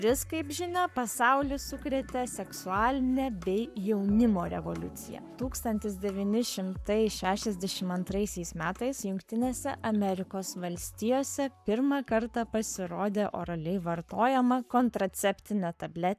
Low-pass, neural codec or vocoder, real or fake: 14.4 kHz; codec, 44.1 kHz, 7.8 kbps, Pupu-Codec; fake